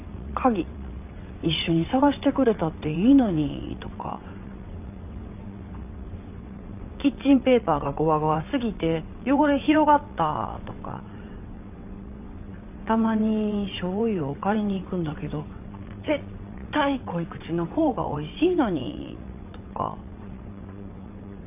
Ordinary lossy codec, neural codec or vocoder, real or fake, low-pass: none; vocoder, 22.05 kHz, 80 mel bands, WaveNeXt; fake; 3.6 kHz